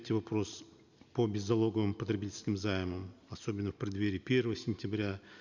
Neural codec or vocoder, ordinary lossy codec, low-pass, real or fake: none; none; 7.2 kHz; real